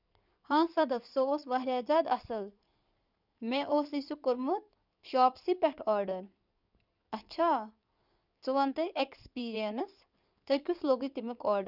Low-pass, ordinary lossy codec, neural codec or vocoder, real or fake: 5.4 kHz; none; codec, 16 kHz in and 24 kHz out, 2.2 kbps, FireRedTTS-2 codec; fake